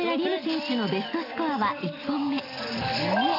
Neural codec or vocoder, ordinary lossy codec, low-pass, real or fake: none; AAC, 24 kbps; 5.4 kHz; real